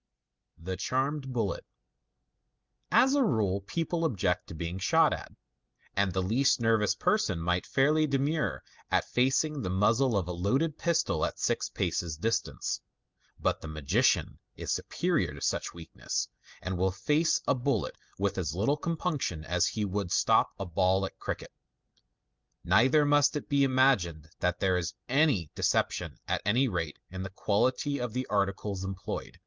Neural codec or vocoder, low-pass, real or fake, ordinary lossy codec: none; 7.2 kHz; real; Opus, 32 kbps